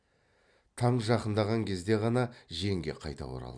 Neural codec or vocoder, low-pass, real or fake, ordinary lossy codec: none; 9.9 kHz; real; Opus, 64 kbps